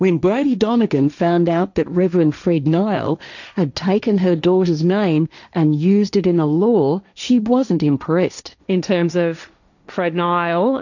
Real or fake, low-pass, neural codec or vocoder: fake; 7.2 kHz; codec, 16 kHz, 1.1 kbps, Voila-Tokenizer